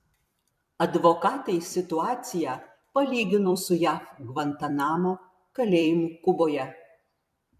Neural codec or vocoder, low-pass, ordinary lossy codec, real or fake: vocoder, 44.1 kHz, 128 mel bands every 256 samples, BigVGAN v2; 14.4 kHz; MP3, 96 kbps; fake